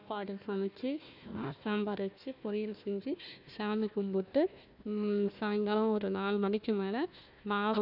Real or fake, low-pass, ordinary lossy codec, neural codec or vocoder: fake; 5.4 kHz; none; codec, 16 kHz, 1 kbps, FunCodec, trained on Chinese and English, 50 frames a second